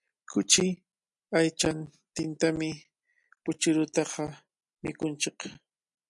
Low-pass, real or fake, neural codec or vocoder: 10.8 kHz; real; none